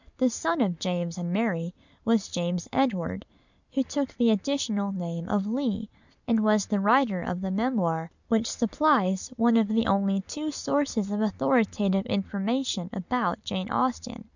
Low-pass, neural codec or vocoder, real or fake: 7.2 kHz; none; real